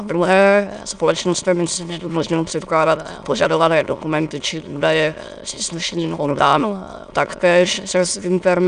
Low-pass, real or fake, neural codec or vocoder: 9.9 kHz; fake; autoencoder, 22.05 kHz, a latent of 192 numbers a frame, VITS, trained on many speakers